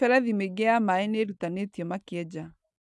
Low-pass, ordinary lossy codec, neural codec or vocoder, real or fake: none; none; none; real